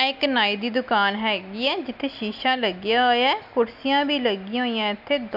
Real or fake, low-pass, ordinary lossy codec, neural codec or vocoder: real; 5.4 kHz; none; none